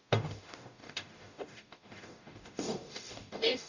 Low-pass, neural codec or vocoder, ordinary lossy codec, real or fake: 7.2 kHz; codec, 44.1 kHz, 0.9 kbps, DAC; none; fake